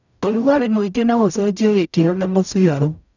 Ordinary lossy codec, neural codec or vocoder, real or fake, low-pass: none; codec, 44.1 kHz, 0.9 kbps, DAC; fake; 7.2 kHz